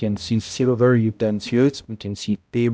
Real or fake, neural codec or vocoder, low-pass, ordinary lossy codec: fake; codec, 16 kHz, 0.5 kbps, X-Codec, HuBERT features, trained on balanced general audio; none; none